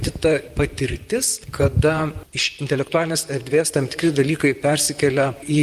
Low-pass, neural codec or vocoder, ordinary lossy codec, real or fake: 14.4 kHz; vocoder, 44.1 kHz, 128 mel bands, Pupu-Vocoder; Opus, 32 kbps; fake